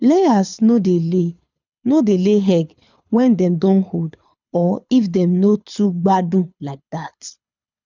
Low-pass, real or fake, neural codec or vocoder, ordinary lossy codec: 7.2 kHz; fake; codec, 24 kHz, 6 kbps, HILCodec; none